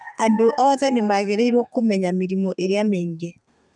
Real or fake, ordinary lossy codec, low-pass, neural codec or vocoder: fake; none; 10.8 kHz; codec, 32 kHz, 1.9 kbps, SNAC